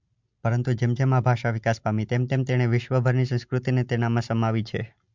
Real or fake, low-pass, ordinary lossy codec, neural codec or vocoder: real; 7.2 kHz; MP3, 64 kbps; none